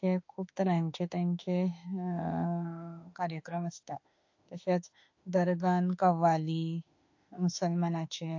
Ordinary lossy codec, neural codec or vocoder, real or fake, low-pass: AAC, 48 kbps; autoencoder, 48 kHz, 32 numbers a frame, DAC-VAE, trained on Japanese speech; fake; 7.2 kHz